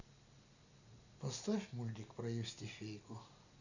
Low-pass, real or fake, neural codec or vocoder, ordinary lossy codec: 7.2 kHz; real; none; none